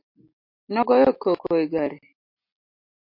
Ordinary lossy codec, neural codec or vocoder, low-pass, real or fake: MP3, 32 kbps; none; 5.4 kHz; real